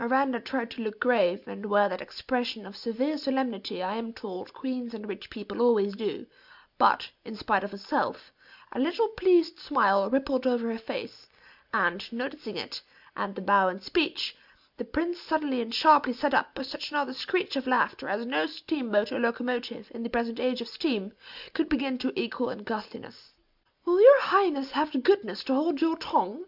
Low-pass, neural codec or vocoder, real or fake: 5.4 kHz; none; real